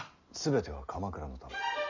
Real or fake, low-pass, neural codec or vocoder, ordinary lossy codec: real; 7.2 kHz; none; none